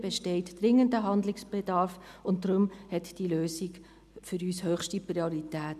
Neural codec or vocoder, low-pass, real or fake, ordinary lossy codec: none; 14.4 kHz; real; none